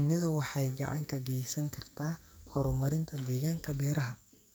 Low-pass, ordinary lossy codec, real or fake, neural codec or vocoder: none; none; fake; codec, 44.1 kHz, 2.6 kbps, SNAC